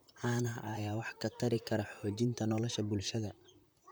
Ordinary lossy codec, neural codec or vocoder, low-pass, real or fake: none; vocoder, 44.1 kHz, 128 mel bands, Pupu-Vocoder; none; fake